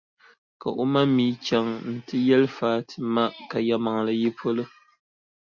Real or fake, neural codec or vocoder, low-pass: real; none; 7.2 kHz